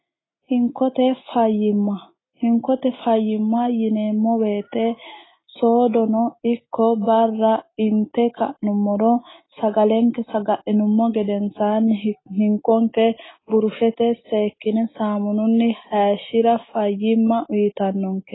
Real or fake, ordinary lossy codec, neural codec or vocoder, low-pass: real; AAC, 16 kbps; none; 7.2 kHz